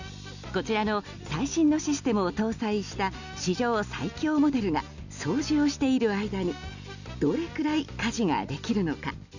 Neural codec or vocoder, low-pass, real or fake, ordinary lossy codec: none; 7.2 kHz; real; none